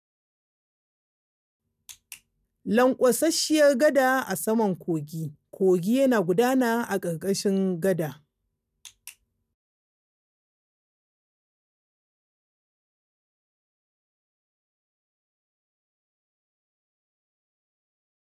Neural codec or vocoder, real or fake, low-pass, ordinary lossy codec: none; real; 14.4 kHz; none